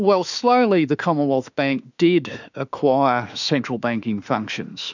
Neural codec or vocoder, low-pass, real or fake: autoencoder, 48 kHz, 32 numbers a frame, DAC-VAE, trained on Japanese speech; 7.2 kHz; fake